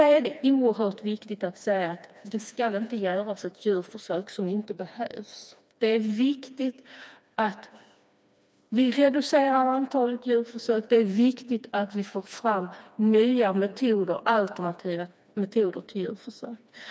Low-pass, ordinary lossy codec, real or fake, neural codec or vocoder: none; none; fake; codec, 16 kHz, 2 kbps, FreqCodec, smaller model